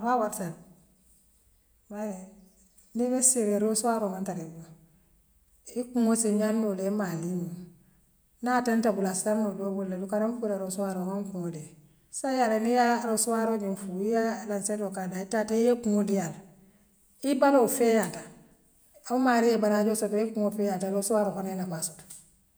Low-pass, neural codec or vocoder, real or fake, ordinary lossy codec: none; vocoder, 48 kHz, 128 mel bands, Vocos; fake; none